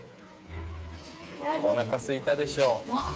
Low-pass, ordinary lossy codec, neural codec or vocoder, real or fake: none; none; codec, 16 kHz, 4 kbps, FreqCodec, smaller model; fake